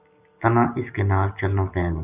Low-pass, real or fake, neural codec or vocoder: 3.6 kHz; real; none